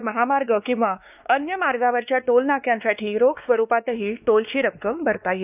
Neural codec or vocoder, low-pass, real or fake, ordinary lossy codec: codec, 16 kHz, 2 kbps, X-Codec, WavLM features, trained on Multilingual LibriSpeech; 3.6 kHz; fake; none